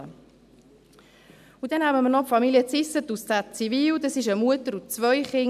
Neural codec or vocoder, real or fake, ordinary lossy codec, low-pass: none; real; none; 14.4 kHz